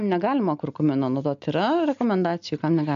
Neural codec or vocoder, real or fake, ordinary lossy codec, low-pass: none; real; MP3, 64 kbps; 7.2 kHz